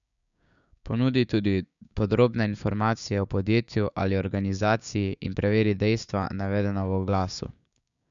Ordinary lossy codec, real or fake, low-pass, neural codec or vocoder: none; fake; 7.2 kHz; codec, 16 kHz, 6 kbps, DAC